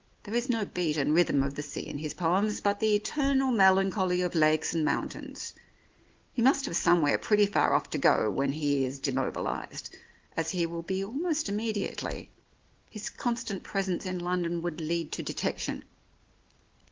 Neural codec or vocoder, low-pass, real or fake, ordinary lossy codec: none; 7.2 kHz; real; Opus, 16 kbps